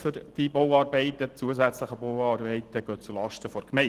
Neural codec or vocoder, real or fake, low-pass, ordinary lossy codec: none; real; 14.4 kHz; Opus, 16 kbps